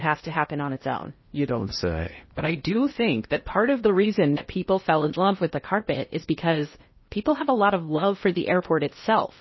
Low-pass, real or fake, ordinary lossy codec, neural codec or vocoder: 7.2 kHz; fake; MP3, 24 kbps; codec, 16 kHz in and 24 kHz out, 0.8 kbps, FocalCodec, streaming, 65536 codes